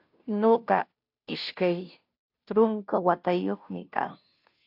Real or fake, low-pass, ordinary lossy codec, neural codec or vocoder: fake; 5.4 kHz; AAC, 48 kbps; codec, 16 kHz, 0.5 kbps, FunCodec, trained on Chinese and English, 25 frames a second